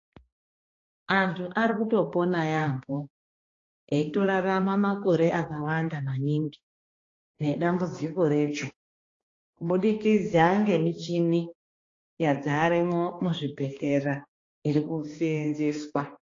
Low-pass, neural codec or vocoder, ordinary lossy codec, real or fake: 7.2 kHz; codec, 16 kHz, 2 kbps, X-Codec, HuBERT features, trained on balanced general audio; AAC, 32 kbps; fake